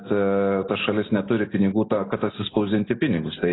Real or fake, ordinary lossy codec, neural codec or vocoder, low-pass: real; AAC, 16 kbps; none; 7.2 kHz